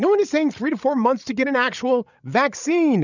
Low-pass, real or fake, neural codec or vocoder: 7.2 kHz; fake; codec, 16 kHz, 8 kbps, FreqCodec, larger model